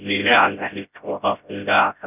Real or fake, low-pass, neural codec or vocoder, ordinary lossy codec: fake; 3.6 kHz; codec, 16 kHz, 0.5 kbps, FreqCodec, smaller model; none